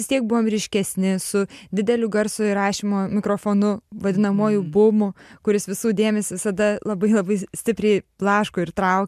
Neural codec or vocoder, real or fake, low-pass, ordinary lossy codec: none; real; 14.4 kHz; MP3, 96 kbps